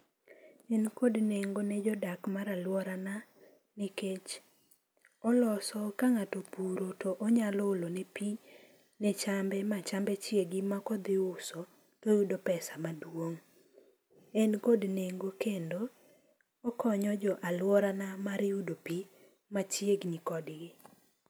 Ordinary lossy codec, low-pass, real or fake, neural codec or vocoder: none; none; real; none